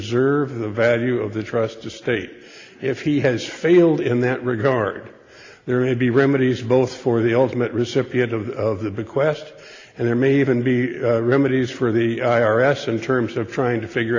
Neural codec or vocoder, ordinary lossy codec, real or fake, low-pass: none; AAC, 32 kbps; real; 7.2 kHz